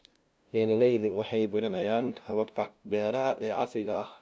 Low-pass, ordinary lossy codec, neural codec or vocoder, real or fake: none; none; codec, 16 kHz, 0.5 kbps, FunCodec, trained on LibriTTS, 25 frames a second; fake